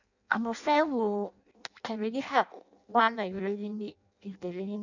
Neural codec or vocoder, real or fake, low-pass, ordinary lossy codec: codec, 16 kHz in and 24 kHz out, 0.6 kbps, FireRedTTS-2 codec; fake; 7.2 kHz; none